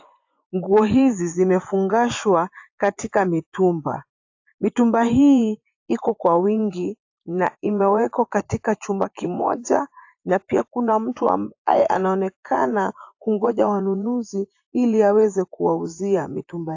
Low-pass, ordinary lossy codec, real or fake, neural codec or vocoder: 7.2 kHz; AAC, 48 kbps; fake; vocoder, 24 kHz, 100 mel bands, Vocos